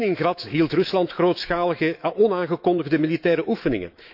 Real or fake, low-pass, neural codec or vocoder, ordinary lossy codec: fake; 5.4 kHz; autoencoder, 48 kHz, 128 numbers a frame, DAC-VAE, trained on Japanese speech; none